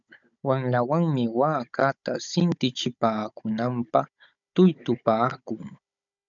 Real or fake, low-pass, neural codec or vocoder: fake; 7.2 kHz; codec, 16 kHz, 16 kbps, FunCodec, trained on Chinese and English, 50 frames a second